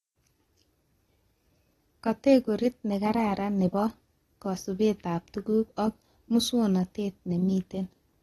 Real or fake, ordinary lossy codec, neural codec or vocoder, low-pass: real; AAC, 32 kbps; none; 19.8 kHz